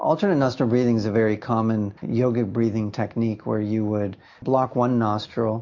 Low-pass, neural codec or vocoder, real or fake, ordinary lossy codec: 7.2 kHz; none; real; MP3, 48 kbps